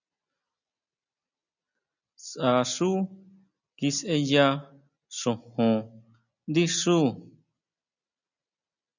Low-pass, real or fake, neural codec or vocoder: 7.2 kHz; real; none